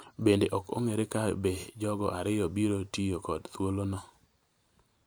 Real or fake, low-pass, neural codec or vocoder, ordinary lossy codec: fake; none; vocoder, 44.1 kHz, 128 mel bands every 512 samples, BigVGAN v2; none